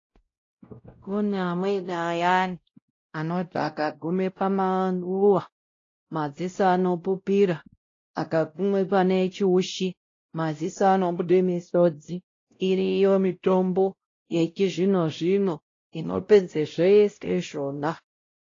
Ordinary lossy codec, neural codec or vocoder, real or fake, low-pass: AAC, 32 kbps; codec, 16 kHz, 0.5 kbps, X-Codec, WavLM features, trained on Multilingual LibriSpeech; fake; 7.2 kHz